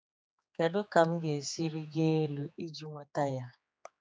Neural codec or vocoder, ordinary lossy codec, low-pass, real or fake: codec, 16 kHz, 4 kbps, X-Codec, HuBERT features, trained on general audio; none; none; fake